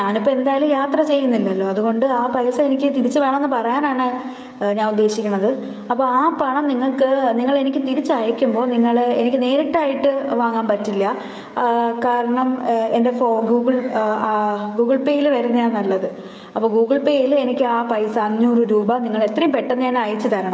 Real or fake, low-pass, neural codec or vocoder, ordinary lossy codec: fake; none; codec, 16 kHz, 16 kbps, FreqCodec, smaller model; none